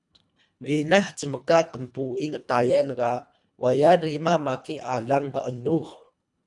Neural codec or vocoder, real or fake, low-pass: codec, 24 kHz, 1.5 kbps, HILCodec; fake; 10.8 kHz